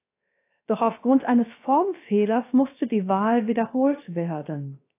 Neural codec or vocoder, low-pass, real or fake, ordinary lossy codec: codec, 16 kHz, 0.7 kbps, FocalCodec; 3.6 kHz; fake; AAC, 24 kbps